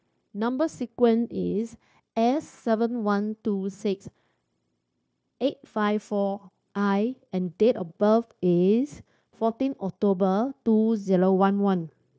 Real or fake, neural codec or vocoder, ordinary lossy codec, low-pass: fake; codec, 16 kHz, 0.9 kbps, LongCat-Audio-Codec; none; none